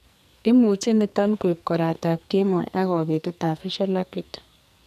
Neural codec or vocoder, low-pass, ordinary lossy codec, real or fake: codec, 44.1 kHz, 2.6 kbps, SNAC; 14.4 kHz; none; fake